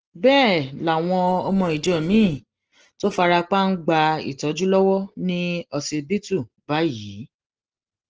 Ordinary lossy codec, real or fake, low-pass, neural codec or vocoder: Opus, 16 kbps; real; 7.2 kHz; none